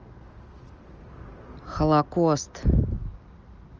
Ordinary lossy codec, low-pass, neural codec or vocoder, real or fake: Opus, 24 kbps; 7.2 kHz; none; real